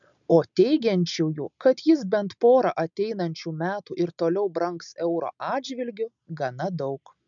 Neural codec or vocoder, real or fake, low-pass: none; real; 7.2 kHz